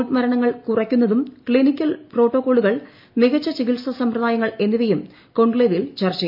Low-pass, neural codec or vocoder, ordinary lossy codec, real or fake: 5.4 kHz; none; MP3, 48 kbps; real